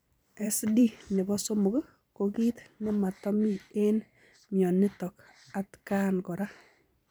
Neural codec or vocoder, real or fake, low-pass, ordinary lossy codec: vocoder, 44.1 kHz, 128 mel bands every 512 samples, BigVGAN v2; fake; none; none